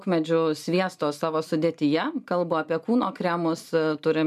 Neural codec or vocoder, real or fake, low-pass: none; real; 14.4 kHz